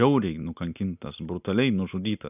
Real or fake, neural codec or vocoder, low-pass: real; none; 3.6 kHz